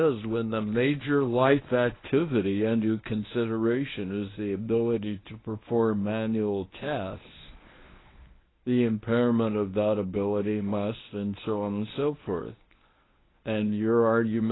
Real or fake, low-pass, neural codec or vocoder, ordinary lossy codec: fake; 7.2 kHz; codec, 24 kHz, 0.9 kbps, WavTokenizer, medium speech release version 1; AAC, 16 kbps